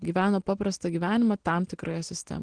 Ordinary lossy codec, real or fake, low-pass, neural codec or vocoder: Opus, 16 kbps; real; 9.9 kHz; none